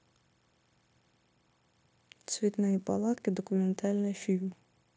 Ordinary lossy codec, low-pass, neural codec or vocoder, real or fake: none; none; codec, 16 kHz, 0.9 kbps, LongCat-Audio-Codec; fake